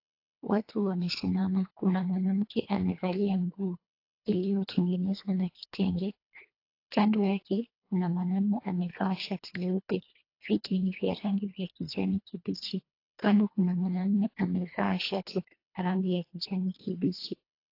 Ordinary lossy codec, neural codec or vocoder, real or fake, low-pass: AAC, 32 kbps; codec, 24 kHz, 1.5 kbps, HILCodec; fake; 5.4 kHz